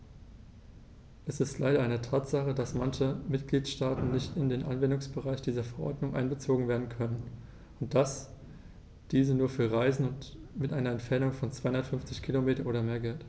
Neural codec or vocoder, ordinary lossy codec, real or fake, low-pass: none; none; real; none